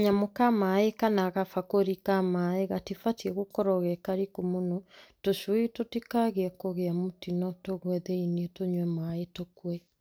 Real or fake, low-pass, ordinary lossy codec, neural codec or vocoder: real; none; none; none